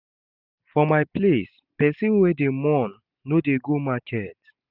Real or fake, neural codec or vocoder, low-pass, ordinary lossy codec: real; none; 5.4 kHz; none